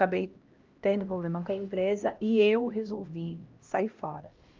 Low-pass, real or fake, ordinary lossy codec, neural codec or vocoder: 7.2 kHz; fake; Opus, 24 kbps; codec, 16 kHz, 1 kbps, X-Codec, HuBERT features, trained on LibriSpeech